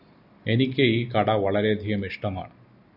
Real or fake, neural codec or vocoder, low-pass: real; none; 5.4 kHz